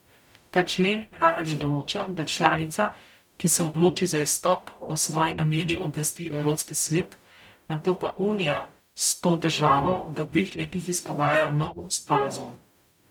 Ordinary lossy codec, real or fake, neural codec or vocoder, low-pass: none; fake; codec, 44.1 kHz, 0.9 kbps, DAC; 19.8 kHz